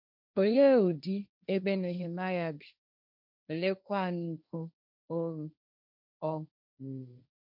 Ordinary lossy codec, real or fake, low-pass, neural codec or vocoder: none; fake; 5.4 kHz; codec, 16 kHz, 1.1 kbps, Voila-Tokenizer